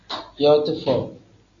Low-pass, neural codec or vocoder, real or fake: 7.2 kHz; none; real